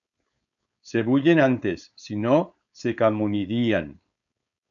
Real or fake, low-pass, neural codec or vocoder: fake; 7.2 kHz; codec, 16 kHz, 4.8 kbps, FACodec